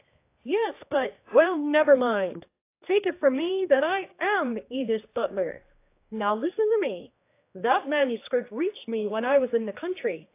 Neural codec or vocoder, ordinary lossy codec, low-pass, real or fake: codec, 16 kHz, 2 kbps, X-Codec, HuBERT features, trained on general audio; AAC, 24 kbps; 3.6 kHz; fake